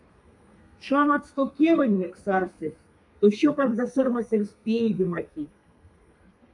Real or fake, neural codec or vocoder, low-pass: fake; codec, 32 kHz, 1.9 kbps, SNAC; 10.8 kHz